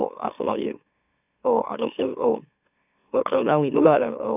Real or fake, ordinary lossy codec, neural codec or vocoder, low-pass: fake; none; autoencoder, 44.1 kHz, a latent of 192 numbers a frame, MeloTTS; 3.6 kHz